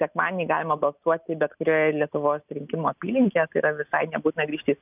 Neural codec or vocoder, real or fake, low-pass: none; real; 3.6 kHz